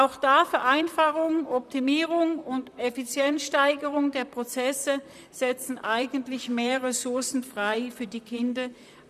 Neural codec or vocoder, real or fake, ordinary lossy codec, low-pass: vocoder, 44.1 kHz, 128 mel bands, Pupu-Vocoder; fake; none; 14.4 kHz